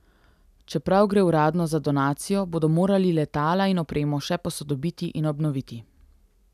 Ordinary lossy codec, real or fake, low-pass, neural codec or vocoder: none; real; 14.4 kHz; none